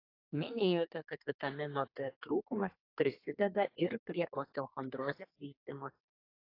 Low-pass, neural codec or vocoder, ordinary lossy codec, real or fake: 5.4 kHz; codec, 32 kHz, 1.9 kbps, SNAC; AAC, 32 kbps; fake